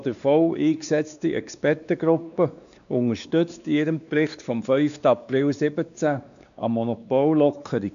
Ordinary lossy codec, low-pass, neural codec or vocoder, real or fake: none; 7.2 kHz; codec, 16 kHz, 2 kbps, X-Codec, WavLM features, trained on Multilingual LibriSpeech; fake